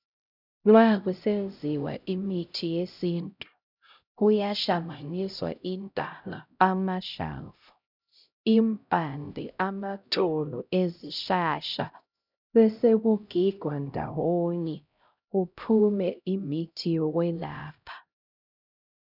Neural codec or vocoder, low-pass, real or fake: codec, 16 kHz, 0.5 kbps, X-Codec, HuBERT features, trained on LibriSpeech; 5.4 kHz; fake